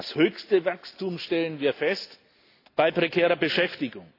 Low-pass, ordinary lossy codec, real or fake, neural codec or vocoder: 5.4 kHz; AAC, 32 kbps; real; none